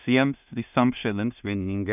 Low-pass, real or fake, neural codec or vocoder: 3.6 kHz; fake; codec, 16 kHz in and 24 kHz out, 0.4 kbps, LongCat-Audio-Codec, two codebook decoder